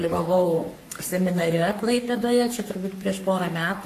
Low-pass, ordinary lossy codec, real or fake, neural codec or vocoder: 14.4 kHz; AAC, 64 kbps; fake; codec, 44.1 kHz, 3.4 kbps, Pupu-Codec